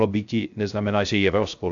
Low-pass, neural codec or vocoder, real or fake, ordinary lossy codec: 7.2 kHz; codec, 16 kHz, 0.3 kbps, FocalCodec; fake; AAC, 64 kbps